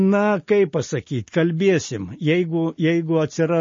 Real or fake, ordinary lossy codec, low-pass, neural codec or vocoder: real; MP3, 32 kbps; 7.2 kHz; none